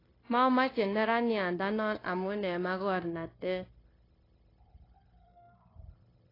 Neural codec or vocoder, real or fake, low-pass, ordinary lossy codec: codec, 16 kHz, 0.9 kbps, LongCat-Audio-Codec; fake; 5.4 kHz; AAC, 24 kbps